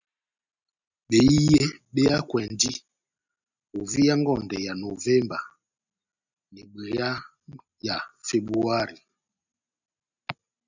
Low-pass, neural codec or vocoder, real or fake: 7.2 kHz; none; real